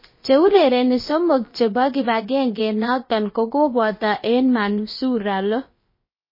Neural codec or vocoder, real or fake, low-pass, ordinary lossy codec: codec, 16 kHz, about 1 kbps, DyCAST, with the encoder's durations; fake; 5.4 kHz; MP3, 24 kbps